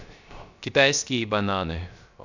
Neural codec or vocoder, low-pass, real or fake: codec, 16 kHz, 0.3 kbps, FocalCodec; 7.2 kHz; fake